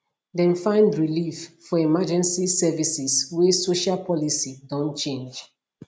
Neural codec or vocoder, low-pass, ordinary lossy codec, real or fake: none; none; none; real